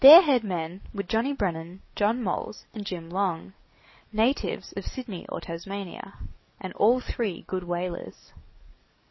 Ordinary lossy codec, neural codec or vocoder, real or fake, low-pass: MP3, 24 kbps; codec, 44.1 kHz, 7.8 kbps, DAC; fake; 7.2 kHz